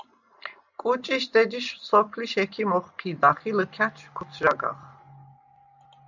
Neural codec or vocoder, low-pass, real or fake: none; 7.2 kHz; real